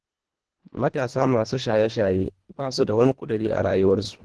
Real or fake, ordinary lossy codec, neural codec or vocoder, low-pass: fake; Opus, 16 kbps; codec, 24 kHz, 1.5 kbps, HILCodec; 10.8 kHz